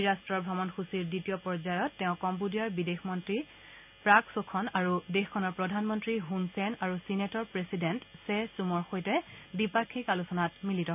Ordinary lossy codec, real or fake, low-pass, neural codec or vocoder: none; real; 3.6 kHz; none